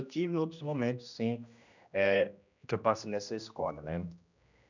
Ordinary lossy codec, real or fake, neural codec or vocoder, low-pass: none; fake; codec, 16 kHz, 1 kbps, X-Codec, HuBERT features, trained on general audio; 7.2 kHz